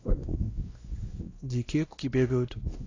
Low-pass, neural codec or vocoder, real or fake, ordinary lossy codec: 7.2 kHz; codec, 16 kHz, 1 kbps, X-Codec, WavLM features, trained on Multilingual LibriSpeech; fake; AAC, 32 kbps